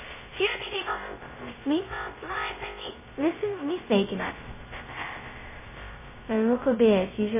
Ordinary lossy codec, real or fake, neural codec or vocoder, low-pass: MP3, 16 kbps; fake; codec, 16 kHz, 0.2 kbps, FocalCodec; 3.6 kHz